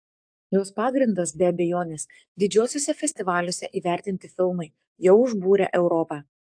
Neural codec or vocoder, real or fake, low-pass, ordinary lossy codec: codec, 44.1 kHz, 7.8 kbps, DAC; fake; 9.9 kHz; AAC, 64 kbps